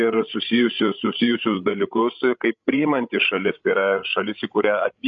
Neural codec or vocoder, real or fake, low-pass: codec, 16 kHz, 16 kbps, FreqCodec, larger model; fake; 7.2 kHz